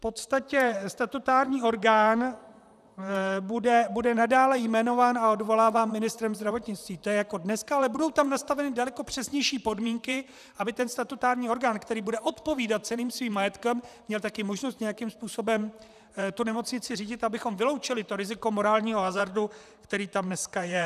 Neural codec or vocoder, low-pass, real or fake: vocoder, 44.1 kHz, 128 mel bands, Pupu-Vocoder; 14.4 kHz; fake